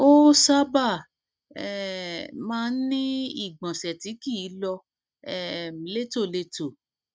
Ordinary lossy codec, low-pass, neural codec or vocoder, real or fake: none; none; none; real